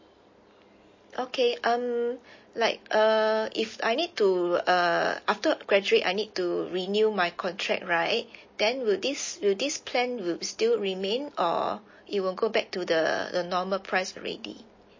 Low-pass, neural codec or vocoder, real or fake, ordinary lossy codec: 7.2 kHz; none; real; MP3, 32 kbps